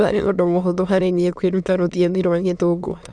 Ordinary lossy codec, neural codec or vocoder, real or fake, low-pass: none; autoencoder, 22.05 kHz, a latent of 192 numbers a frame, VITS, trained on many speakers; fake; 9.9 kHz